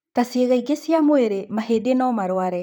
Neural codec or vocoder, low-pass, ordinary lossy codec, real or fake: vocoder, 44.1 kHz, 128 mel bands, Pupu-Vocoder; none; none; fake